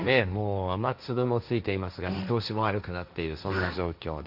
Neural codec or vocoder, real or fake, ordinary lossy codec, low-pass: codec, 16 kHz, 1.1 kbps, Voila-Tokenizer; fake; none; 5.4 kHz